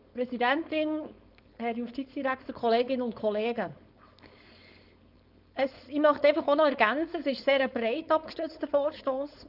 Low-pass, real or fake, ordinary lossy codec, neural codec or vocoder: 5.4 kHz; fake; none; codec, 16 kHz, 4.8 kbps, FACodec